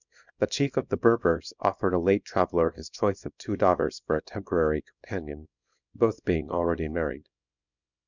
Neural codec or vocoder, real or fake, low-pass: codec, 24 kHz, 0.9 kbps, WavTokenizer, small release; fake; 7.2 kHz